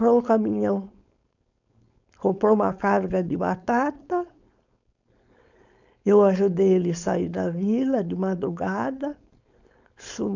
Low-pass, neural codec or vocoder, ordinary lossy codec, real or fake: 7.2 kHz; codec, 16 kHz, 4.8 kbps, FACodec; none; fake